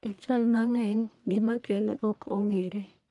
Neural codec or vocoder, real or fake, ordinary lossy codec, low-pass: codec, 44.1 kHz, 1.7 kbps, Pupu-Codec; fake; none; 10.8 kHz